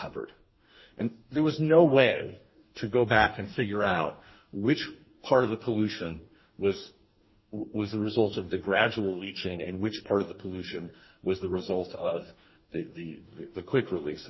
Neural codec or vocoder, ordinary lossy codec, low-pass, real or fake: codec, 44.1 kHz, 2.6 kbps, DAC; MP3, 24 kbps; 7.2 kHz; fake